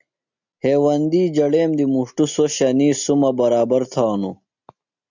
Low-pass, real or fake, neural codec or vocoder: 7.2 kHz; real; none